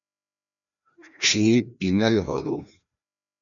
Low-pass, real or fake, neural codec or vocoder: 7.2 kHz; fake; codec, 16 kHz, 1 kbps, FreqCodec, larger model